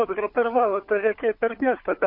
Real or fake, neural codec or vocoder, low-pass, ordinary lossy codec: fake; codec, 16 kHz, 16 kbps, FunCodec, trained on LibriTTS, 50 frames a second; 5.4 kHz; MP3, 32 kbps